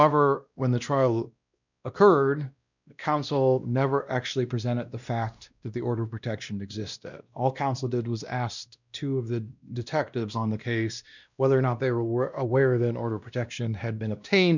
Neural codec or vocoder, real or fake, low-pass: codec, 16 kHz, 1 kbps, X-Codec, WavLM features, trained on Multilingual LibriSpeech; fake; 7.2 kHz